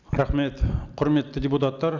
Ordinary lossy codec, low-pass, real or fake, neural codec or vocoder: none; 7.2 kHz; real; none